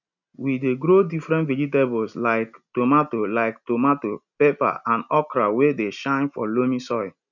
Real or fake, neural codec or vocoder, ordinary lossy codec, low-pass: real; none; none; 7.2 kHz